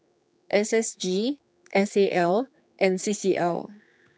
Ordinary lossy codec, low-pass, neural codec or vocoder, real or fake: none; none; codec, 16 kHz, 4 kbps, X-Codec, HuBERT features, trained on general audio; fake